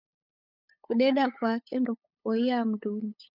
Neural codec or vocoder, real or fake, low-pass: codec, 16 kHz, 8 kbps, FunCodec, trained on LibriTTS, 25 frames a second; fake; 5.4 kHz